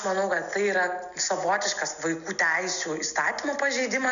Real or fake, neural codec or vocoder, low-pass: real; none; 7.2 kHz